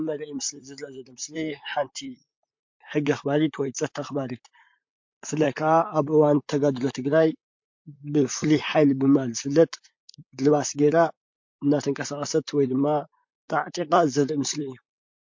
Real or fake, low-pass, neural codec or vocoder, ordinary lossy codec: fake; 7.2 kHz; vocoder, 44.1 kHz, 128 mel bands, Pupu-Vocoder; MP3, 48 kbps